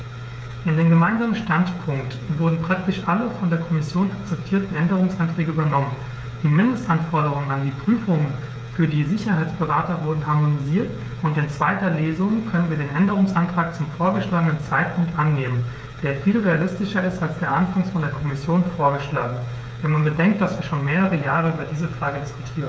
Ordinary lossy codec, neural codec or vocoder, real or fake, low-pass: none; codec, 16 kHz, 8 kbps, FreqCodec, smaller model; fake; none